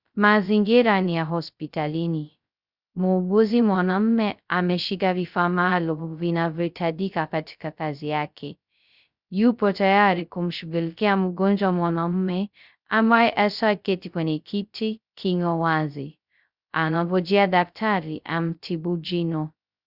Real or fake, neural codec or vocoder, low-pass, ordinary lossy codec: fake; codec, 16 kHz, 0.2 kbps, FocalCodec; 5.4 kHz; Opus, 64 kbps